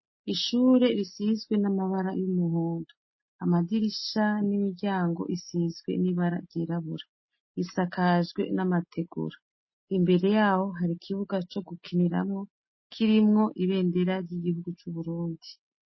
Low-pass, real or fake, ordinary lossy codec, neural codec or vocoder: 7.2 kHz; real; MP3, 24 kbps; none